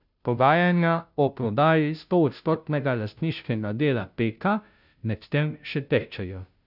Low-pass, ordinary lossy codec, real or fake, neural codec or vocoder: 5.4 kHz; none; fake; codec, 16 kHz, 0.5 kbps, FunCodec, trained on Chinese and English, 25 frames a second